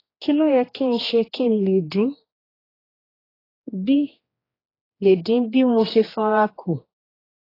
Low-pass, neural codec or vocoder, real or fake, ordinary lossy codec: 5.4 kHz; codec, 16 kHz, 2 kbps, X-Codec, HuBERT features, trained on general audio; fake; AAC, 24 kbps